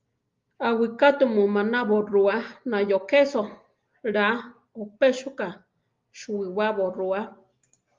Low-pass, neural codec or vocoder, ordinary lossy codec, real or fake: 7.2 kHz; none; Opus, 32 kbps; real